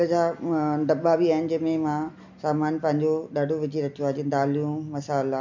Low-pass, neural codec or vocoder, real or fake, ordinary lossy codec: 7.2 kHz; none; real; MP3, 64 kbps